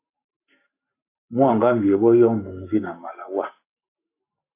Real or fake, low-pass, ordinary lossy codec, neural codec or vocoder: fake; 3.6 kHz; AAC, 24 kbps; codec, 44.1 kHz, 7.8 kbps, Pupu-Codec